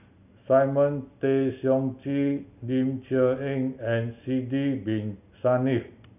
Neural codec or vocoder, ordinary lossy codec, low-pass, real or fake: none; none; 3.6 kHz; real